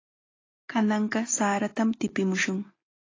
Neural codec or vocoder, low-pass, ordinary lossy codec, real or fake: none; 7.2 kHz; AAC, 32 kbps; real